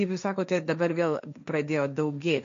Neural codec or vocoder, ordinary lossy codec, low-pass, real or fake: codec, 16 kHz, 1.1 kbps, Voila-Tokenizer; MP3, 64 kbps; 7.2 kHz; fake